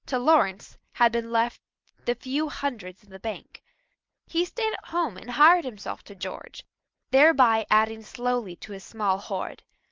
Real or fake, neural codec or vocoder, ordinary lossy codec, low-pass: real; none; Opus, 24 kbps; 7.2 kHz